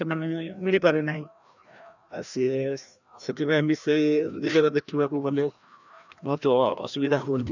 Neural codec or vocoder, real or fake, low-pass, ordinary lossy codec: codec, 16 kHz, 1 kbps, FreqCodec, larger model; fake; 7.2 kHz; none